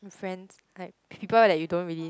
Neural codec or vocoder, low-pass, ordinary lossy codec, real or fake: none; none; none; real